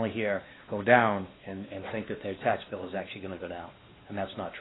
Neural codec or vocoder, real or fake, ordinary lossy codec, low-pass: codec, 16 kHz, 2 kbps, X-Codec, WavLM features, trained on Multilingual LibriSpeech; fake; AAC, 16 kbps; 7.2 kHz